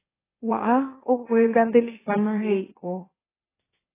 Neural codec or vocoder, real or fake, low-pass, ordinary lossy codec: autoencoder, 44.1 kHz, a latent of 192 numbers a frame, MeloTTS; fake; 3.6 kHz; AAC, 16 kbps